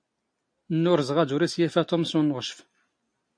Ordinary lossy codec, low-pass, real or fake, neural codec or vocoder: MP3, 48 kbps; 9.9 kHz; real; none